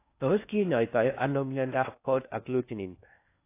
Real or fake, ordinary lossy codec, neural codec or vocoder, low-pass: fake; AAC, 24 kbps; codec, 16 kHz in and 24 kHz out, 0.6 kbps, FocalCodec, streaming, 4096 codes; 3.6 kHz